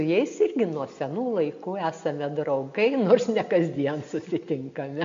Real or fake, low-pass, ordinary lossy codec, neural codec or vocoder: real; 7.2 kHz; MP3, 48 kbps; none